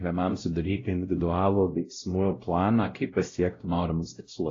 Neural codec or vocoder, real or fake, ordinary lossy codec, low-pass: codec, 16 kHz, 0.5 kbps, X-Codec, WavLM features, trained on Multilingual LibriSpeech; fake; AAC, 32 kbps; 7.2 kHz